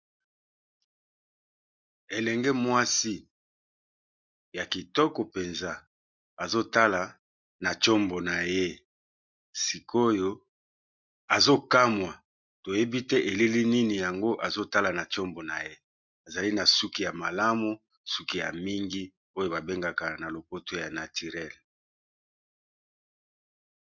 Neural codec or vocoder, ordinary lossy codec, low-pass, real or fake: none; MP3, 64 kbps; 7.2 kHz; real